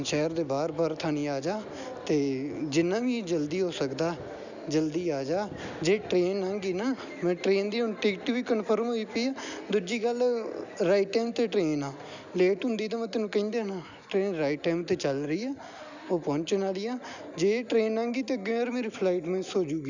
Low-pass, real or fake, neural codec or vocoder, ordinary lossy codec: 7.2 kHz; real; none; none